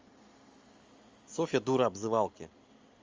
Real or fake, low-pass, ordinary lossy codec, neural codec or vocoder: real; 7.2 kHz; Opus, 32 kbps; none